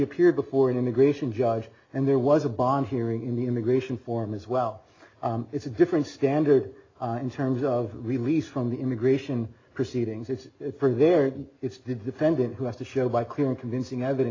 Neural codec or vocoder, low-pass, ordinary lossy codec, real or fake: none; 7.2 kHz; AAC, 32 kbps; real